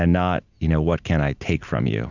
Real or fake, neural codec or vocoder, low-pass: real; none; 7.2 kHz